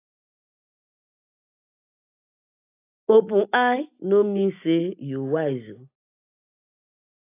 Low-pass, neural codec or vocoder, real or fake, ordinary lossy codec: 3.6 kHz; none; real; AAC, 24 kbps